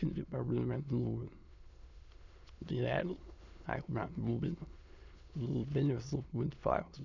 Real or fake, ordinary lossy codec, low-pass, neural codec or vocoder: fake; none; 7.2 kHz; autoencoder, 22.05 kHz, a latent of 192 numbers a frame, VITS, trained on many speakers